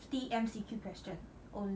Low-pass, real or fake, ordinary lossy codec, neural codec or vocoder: none; real; none; none